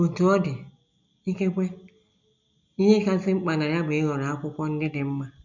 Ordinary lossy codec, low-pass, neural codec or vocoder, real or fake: none; 7.2 kHz; none; real